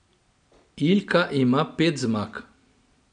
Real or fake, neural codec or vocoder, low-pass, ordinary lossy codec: real; none; 9.9 kHz; none